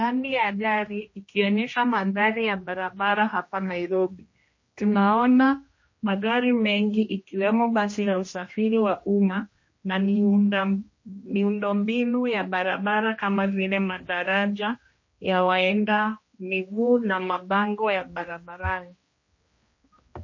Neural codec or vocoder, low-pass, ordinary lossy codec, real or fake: codec, 16 kHz, 1 kbps, X-Codec, HuBERT features, trained on general audio; 7.2 kHz; MP3, 32 kbps; fake